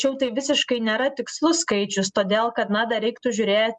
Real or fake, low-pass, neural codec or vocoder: real; 10.8 kHz; none